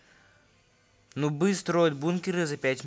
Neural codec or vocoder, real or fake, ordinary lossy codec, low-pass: none; real; none; none